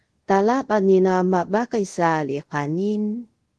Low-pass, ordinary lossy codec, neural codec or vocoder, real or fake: 10.8 kHz; Opus, 16 kbps; codec, 24 kHz, 0.5 kbps, DualCodec; fake